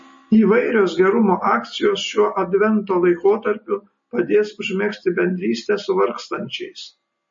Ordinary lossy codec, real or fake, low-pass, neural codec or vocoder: MP3, 32 kbps; real; 7.2 kHz; none